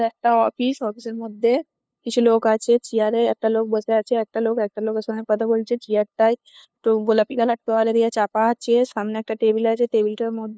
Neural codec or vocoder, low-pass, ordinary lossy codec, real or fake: codec, 16 kHz, 2 kbps, FunCodec, trained on LibriTTS, 25 frames a second; none; none; fake